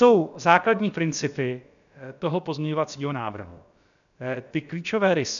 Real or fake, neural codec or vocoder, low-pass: fake; codec, 16 kHz, about 1 kbps, DyCAST, with the encoder's durations; 7.2 kHz